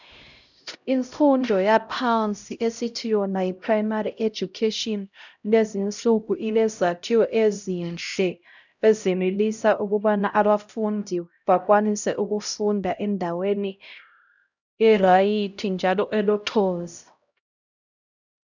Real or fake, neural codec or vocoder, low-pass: fake; codec, 16 kHz, 0.5 kbps, X-Codec, HuBERT features, trained on LibriSpeech; 7.2 kHz